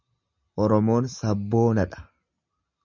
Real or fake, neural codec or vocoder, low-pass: real; none; 7.2 kHz